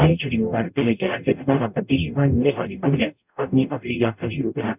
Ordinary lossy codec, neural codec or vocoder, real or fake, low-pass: none; codec, 44.1 kHz, 0.9 kbps, DAC; fake; 3.6 kHz